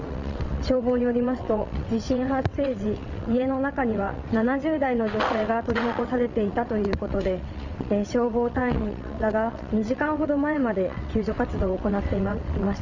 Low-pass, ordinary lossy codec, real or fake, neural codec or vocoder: 7.2 kHz; none; fake; vocoder, 22.05 kHz, 80 mel bands, WaveNeXt